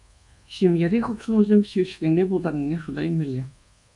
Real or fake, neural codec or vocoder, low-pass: fake; codec, 24 kHz, 1.2 kbps, DualCodec; 10.8 kHz